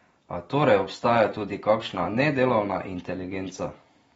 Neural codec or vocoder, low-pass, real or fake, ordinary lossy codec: none; 19.8 kHz; real; AAC, 24 kbps